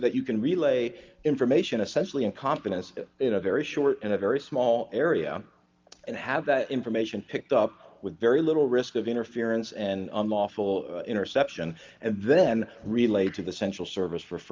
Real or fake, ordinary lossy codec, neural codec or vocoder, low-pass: real; Opus, 24 kbps; none; 7.2 kHz